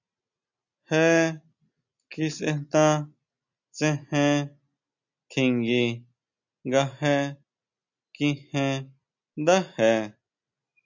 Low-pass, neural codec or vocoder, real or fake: 7.2 kHz; none; real